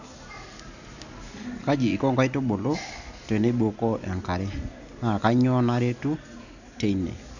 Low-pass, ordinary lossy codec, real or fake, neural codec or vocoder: 7.2 kHz; none; real; none